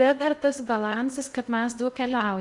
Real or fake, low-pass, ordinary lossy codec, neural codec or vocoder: fake; 10.8 kHz; Opus, 64 kbps; codec, 16 kHz in and 24 kHz out, 0.6 kbps, FocalCodec, streaming, 2048 codes